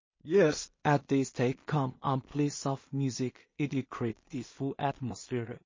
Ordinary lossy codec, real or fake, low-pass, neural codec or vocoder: MP3, 32 kbps; fake; 7.2 kHz; codec, 16 kHz in and 24 kHz out, 0.4 kbps, LongCat-Audio-Codec, two codebook decoder